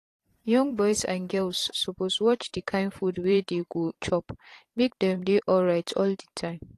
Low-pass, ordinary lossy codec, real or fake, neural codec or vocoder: 14.4 kHz; AAC, 48 kbps; fake; vocoder, 44.1 kHz, 128 mel bands every 512 samples, BigVGAN v2